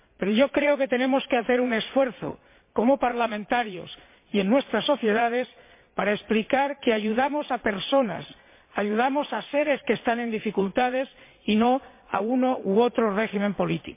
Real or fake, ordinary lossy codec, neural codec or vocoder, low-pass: fake; MP3, 24 kbps; vocoder, 22.05 kHz, 80 mel bands, Vocos; 3.6 kHz